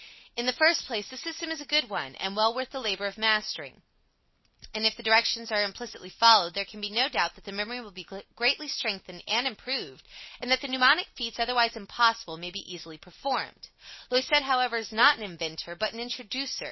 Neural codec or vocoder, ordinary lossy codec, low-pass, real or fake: none; MP3, 24 kbps; 7.2 kHz; real